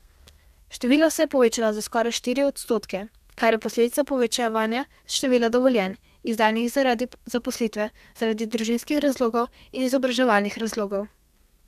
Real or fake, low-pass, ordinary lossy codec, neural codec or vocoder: fake; 14.4 kHz; none; codec, 32 kHz, 1.9 kbps, SNAC